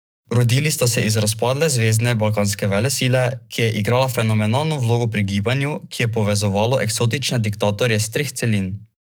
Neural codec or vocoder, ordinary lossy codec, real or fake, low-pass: codec, 44.1 kHz, 7.8 kbps, DAC; none; fake; none